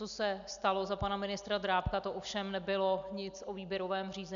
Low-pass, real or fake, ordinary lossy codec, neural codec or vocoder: 7.2 kHz; real; MP3, 96 kbps; none